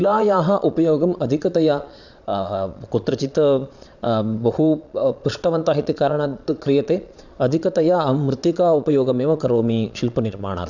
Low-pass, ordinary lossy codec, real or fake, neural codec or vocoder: 7.2 kHz; none; fake; vocoder, 22.05 kHz, 80 mel bands, Vocos